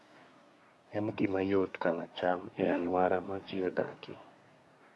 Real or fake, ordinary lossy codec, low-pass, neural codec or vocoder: fake; none; none; codec, 24 kHz, 1 kbps, SNAC